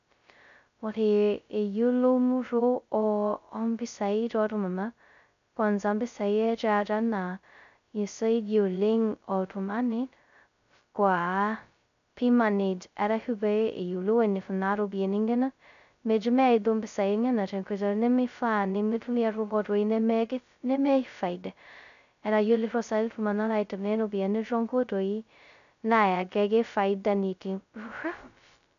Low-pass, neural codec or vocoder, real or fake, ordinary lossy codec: 7.2 kHz; codec, 16 kHz, 0.2 kbps, FocalCodec; fake; none